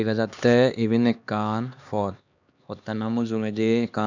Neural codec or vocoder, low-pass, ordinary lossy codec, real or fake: codec, 16 kHz, 2 kbps, X-Codec, HuBERT features, trained on LibriSpeech; 7.2 kHz; none; fake